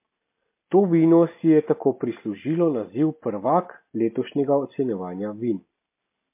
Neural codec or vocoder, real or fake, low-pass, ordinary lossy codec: none; real; 3.6 kHz; MP3, 24 kbps